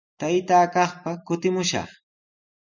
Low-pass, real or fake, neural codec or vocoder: 7.2 kHz; real; none